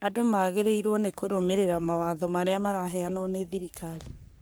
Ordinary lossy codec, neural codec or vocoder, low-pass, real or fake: none; codec, 44.1 kHz, 3.4 kbps, Pupu-Codec; none; fake